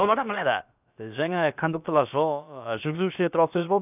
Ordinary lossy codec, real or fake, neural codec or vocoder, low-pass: none; fake; codec, 16 kHz, about 1 kbps, DyCAST, with the encoder's durations; 3.6 kHz